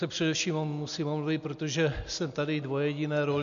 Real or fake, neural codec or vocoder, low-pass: real; none; 7.2 kHz